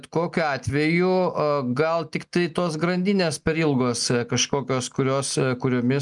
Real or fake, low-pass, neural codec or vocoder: real; 10.8 kHz; none